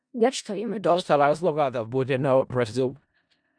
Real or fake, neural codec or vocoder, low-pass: fake; codec, 16 kHz in and 24 kHz out, 0.4 kbps, LongCat-Audio-Codec, four codebook decoder; 9.9 kHz